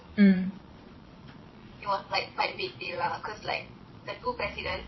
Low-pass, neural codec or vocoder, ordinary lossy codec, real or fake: 7.2 kHz; vocoder, 22.05 kHz, 80 mel bands, Vocos; MP3, 24 kbps; fake